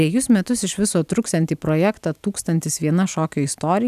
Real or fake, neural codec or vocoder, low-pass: real; none; 14.4 kHz